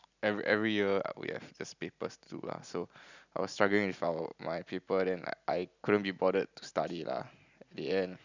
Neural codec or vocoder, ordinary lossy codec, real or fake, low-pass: none; none; real; 7.2 kHz